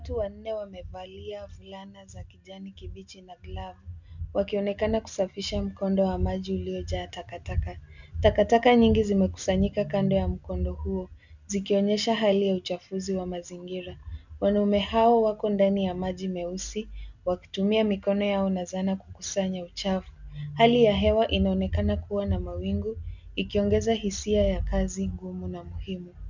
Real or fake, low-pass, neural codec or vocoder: real; 7.2 kHz; none